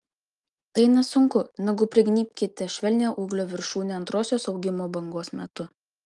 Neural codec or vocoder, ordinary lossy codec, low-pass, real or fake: none; Opus, 32 kbps; 10.8 kHz; real